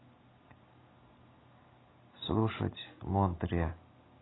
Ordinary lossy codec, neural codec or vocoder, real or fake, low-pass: AAC, 16 kbps; none; real; 7.2 kHz